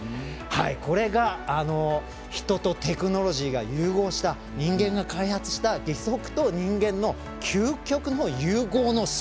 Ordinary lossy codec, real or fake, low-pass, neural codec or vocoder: none; real; none; none